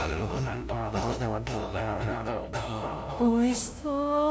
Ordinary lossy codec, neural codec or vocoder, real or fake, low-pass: none; codec, 16 kHz, 0.5 kbps, FunCodec, trained on LibriTTS, 25 frames a second; fake; none